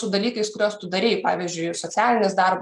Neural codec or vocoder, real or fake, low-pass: none; real; 10.8 kHz